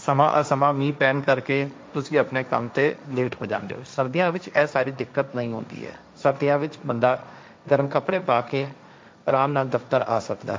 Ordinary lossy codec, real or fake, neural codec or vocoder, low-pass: none; fake; codec, 16 kHz, 1.1 kbps, Voila-Tokenizer; none